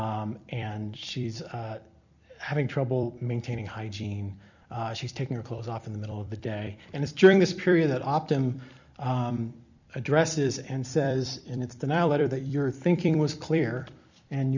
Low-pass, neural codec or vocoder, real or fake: 7.2 kHz; vocoder, 44.1 kHz, 128 mel bands every 256 samples, BigVGAN v2; fake